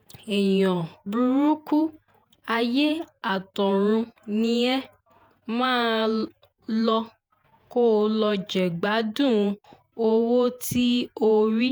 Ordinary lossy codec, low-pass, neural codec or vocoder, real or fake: none; none; vocoder, 48 kHz, 128 mel bands, Vocos; fake